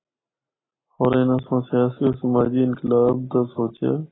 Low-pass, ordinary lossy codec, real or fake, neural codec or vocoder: 7.2 kHz; AAC, 16 kbps; real; none